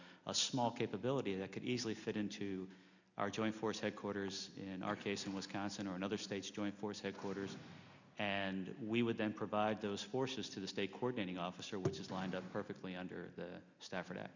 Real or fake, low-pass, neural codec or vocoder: real; 7.2 kHz; none